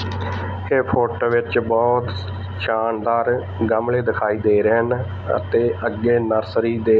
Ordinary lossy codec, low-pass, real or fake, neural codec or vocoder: none; none; real; none